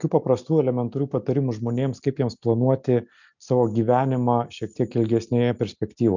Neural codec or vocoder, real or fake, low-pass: none; real; 7.2 kHz